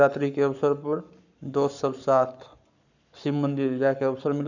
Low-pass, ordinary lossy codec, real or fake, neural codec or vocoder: 7.2 kHz; none; fake; codec, 44.1 kHz, 7.8 kbps, Pupu-Codec